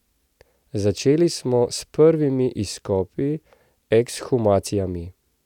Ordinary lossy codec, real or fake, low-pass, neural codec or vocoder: none; real; 19.8 kHz; none